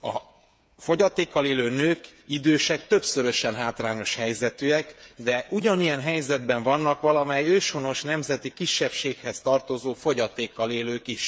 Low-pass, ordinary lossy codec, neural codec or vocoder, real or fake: none; none; codec, 16 kHz, 8 kbps, FreqCodec, smaller model; fake